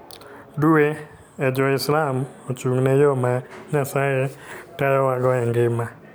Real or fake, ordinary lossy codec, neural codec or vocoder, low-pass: real; none; none; none